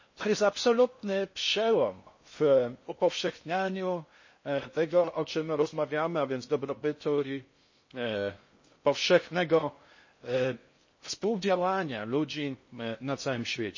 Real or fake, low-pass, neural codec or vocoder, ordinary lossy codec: fake; 7.2 kHz; codec, 16 kHz in and 24 kHz out, 0.6 kbps, FocalCodec, streaming, 4096 codes; MP3, 32 kbps